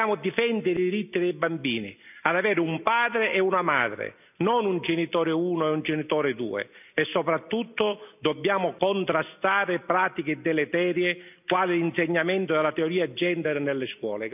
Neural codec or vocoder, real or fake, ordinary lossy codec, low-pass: none; real; none; 3.6 kHz